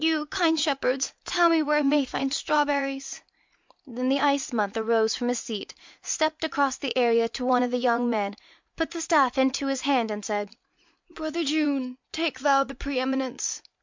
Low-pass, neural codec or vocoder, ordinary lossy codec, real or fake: 7.2 kHz; vocoder, 44.1 kHz, 80 mel bands, Vocos; MP3, 64 kbps; fake